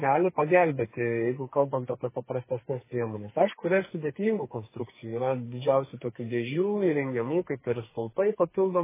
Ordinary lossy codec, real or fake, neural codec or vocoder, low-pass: MP3, 16 kbps; fake; codec, 44.1 kHz, 2.6 kbps, SNAC; 3.6 kHz